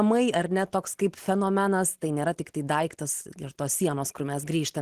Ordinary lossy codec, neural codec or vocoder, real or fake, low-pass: Opus, 16 kbps; none; real; 14.4 kHz